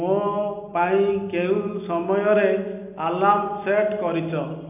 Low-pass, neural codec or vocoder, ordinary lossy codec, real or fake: 3.6 kHz; none; AAC, 32 kbps; real